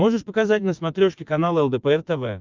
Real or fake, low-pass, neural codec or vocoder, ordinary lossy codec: fake; 7.2 kHz; vocoder, 24 kHz, 100 mel bands, Vocos; Opus, 24 kbps